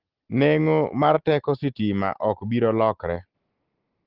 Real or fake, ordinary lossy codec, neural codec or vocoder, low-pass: real; Opus, 16 kbps; none; 5.4 kHz